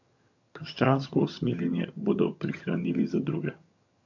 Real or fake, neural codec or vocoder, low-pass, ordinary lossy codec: fake; vocoder, 22.05 kHz, 80 mel bands, HiFi-GAN; 7.2 kHz; AAC, 48 kbps